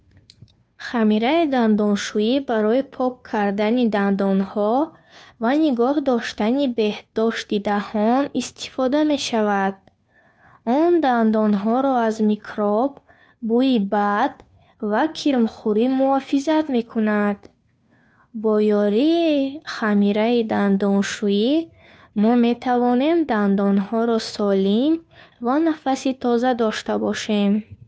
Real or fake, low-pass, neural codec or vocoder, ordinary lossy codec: fake; none; codec, 16 kHz, 2 kbps, FunCodec, trained on Chinese and English, 25 frames a second; none